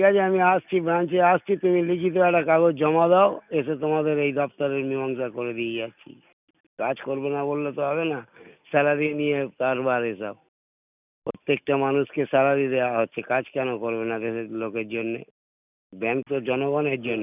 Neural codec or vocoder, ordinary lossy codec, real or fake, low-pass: none; none; real; 3.6 kHz